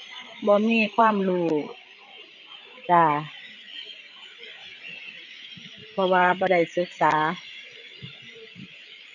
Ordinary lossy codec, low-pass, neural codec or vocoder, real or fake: none; 7.2 kHz; codec, 16 kHz, 8 kbps, FreqCodec, larger model; fake